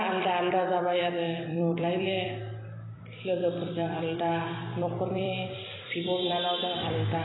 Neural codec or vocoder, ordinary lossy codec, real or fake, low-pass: vocoder, 44.1 kHz, 128 mel bands every 256 samples, BigVGAN v2; AAC, 16 kbps; fake; 7.2 kHz